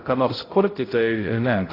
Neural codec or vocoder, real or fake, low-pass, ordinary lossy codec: codec, 16 kHz, 0.5 kbps, X-Codec, HuBERT features, trained on balanced general audio; fake; 5.4 kHz; AAC, 24 kbps